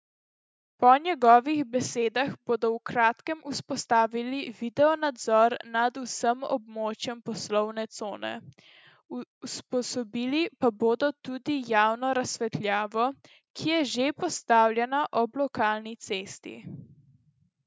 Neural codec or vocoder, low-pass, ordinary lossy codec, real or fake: none; none; none; real